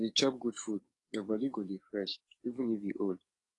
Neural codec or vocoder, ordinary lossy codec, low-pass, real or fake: codec, 44.1 kHz, 7.8 kbps, DAC; AAC, 32 kbps; 10.8 kHz; fake